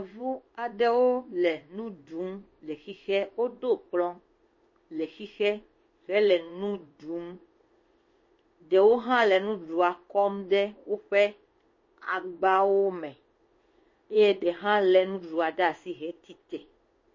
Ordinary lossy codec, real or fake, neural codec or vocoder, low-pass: MP3, 32 kbps; fake; codec, 16 kHz in and 24 kHz out, 1 kbps, XY-Tokenizer; 7.2 kHz